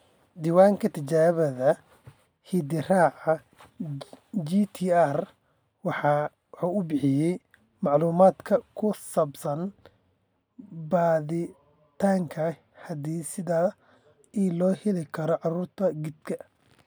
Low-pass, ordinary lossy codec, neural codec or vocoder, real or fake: none; none; none; real